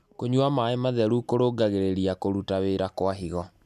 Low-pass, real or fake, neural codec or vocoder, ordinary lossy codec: 14.4 kHz; real; none; none